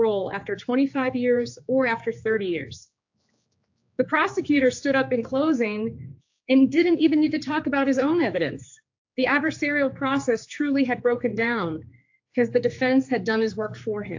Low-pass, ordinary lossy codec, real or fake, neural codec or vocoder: 7.2 kHz; AAC, 48 kbps; fake; codec, 16 kHz, 4 kbps, X-Codec, HuBERT features, trained on general audio